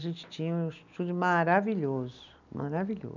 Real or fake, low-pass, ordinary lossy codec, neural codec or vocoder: real; 7.2 kHz; none; none